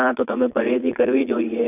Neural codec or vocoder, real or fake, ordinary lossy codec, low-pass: vocoder, 22.05 kHz, 80 mel bands, Vocos; fake; none; 3.6 kHz